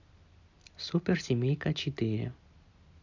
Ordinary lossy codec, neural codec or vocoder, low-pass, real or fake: none; none; 7.2 kHz; real